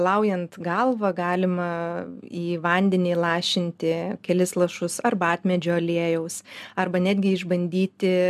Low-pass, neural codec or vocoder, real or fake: 14.4 kHz; none; real